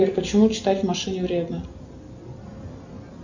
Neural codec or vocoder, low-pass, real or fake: none; 7.2 kHz; real